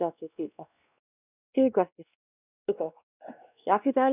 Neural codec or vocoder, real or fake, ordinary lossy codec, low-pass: codec, 16 kHz, 1 kbps, X-Codec, WavLM features, trained on Multilingual LibriSpeech; fake; none; 3.6 kHz